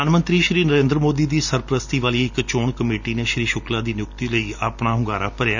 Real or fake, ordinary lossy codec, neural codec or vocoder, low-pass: real; none; none; 7.2 kHz